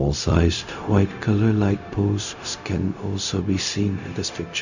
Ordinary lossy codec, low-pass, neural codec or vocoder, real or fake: none; 7.2 kHz; codec, 16 kHz, 0.4 kbps, LongCat-Audio-Codec; fake